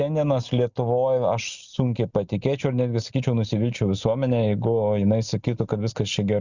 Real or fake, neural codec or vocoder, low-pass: real; none; 7.2 kHz